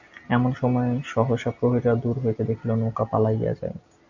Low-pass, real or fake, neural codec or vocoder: 7.2 kHz; real; none